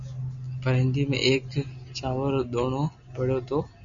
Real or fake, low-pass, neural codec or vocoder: real; 7.2 kHz; none